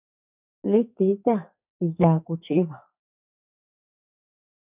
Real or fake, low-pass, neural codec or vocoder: fake; 3.6 kHz; codec, 44.1 kHz, 2.6 kbps, SNAC